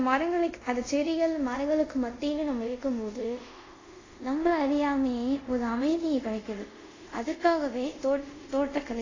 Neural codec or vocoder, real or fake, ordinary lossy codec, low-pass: codec, 24 kHz, 0.5 kbps, DualCodec; fake; AAC, 32 kbps; 7.2 kHz